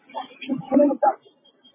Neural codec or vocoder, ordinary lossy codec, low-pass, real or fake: none; MP3, 16 kbps; 3.6 kHz; real